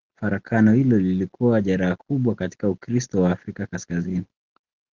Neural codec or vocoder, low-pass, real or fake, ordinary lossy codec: none; 7.2 kHz; real; Opus, 16 kbps